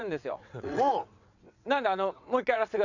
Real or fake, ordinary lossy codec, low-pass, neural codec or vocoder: fake; none; 7.2 kHz; vocoder, 22.05 kHz, 80 mel bands, WaveNeXt